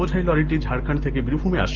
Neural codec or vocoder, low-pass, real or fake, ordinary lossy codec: none; 7.2 kHz; real; Opus, 16 kbps